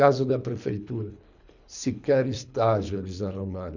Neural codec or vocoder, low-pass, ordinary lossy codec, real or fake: codec, 24 kHz, 3 kbps, HILCodec; 7.2 kHz; none; fake